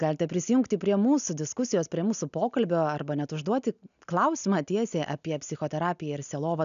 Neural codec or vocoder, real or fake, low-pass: none; real; 7.2 kHz